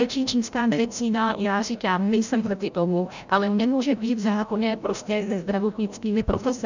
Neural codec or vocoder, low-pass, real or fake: codec, 16 kHz, 0.5 kbps, FreqCodec, larger model; 7.2 kHz; fake